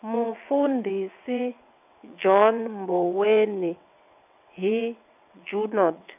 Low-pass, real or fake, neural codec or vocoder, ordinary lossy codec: 3.6 kHz; fake; vocoder, 22.05 kHz, 80 mel bands, WaveNeXt; none